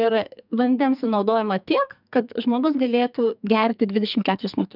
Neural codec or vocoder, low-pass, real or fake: codec, 44.1 kHz, 2.6 kbps, SNAC; 5.4 kHz; fake